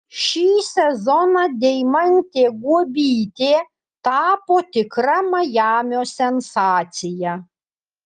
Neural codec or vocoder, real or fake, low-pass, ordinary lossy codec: none; real; 9.9 kHz; Opus, 32 kbps